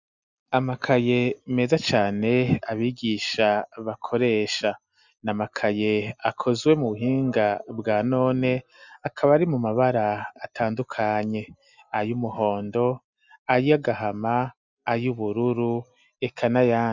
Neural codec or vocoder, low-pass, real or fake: none; 7.2 kHz; real